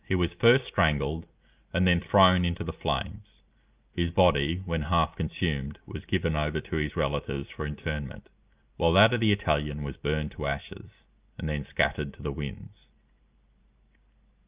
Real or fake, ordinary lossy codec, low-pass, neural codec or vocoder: real; Opus, 24 kbps; 3.6 kHz; none